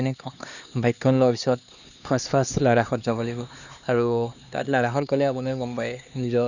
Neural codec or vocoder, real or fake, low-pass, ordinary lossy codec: codec, 16 kHz, 2 kbps, X-Codec, HuBERT features, trained on LibriSpeech; fake; 7.2 kHz; none